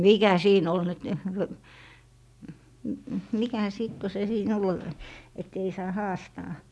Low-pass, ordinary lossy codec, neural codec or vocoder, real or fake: none; none; none; real